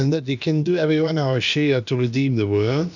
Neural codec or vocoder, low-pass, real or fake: codec, 16 kHz, about 1 kbps, DyCAST, with the encoder's durations; 7.2 kHz; fake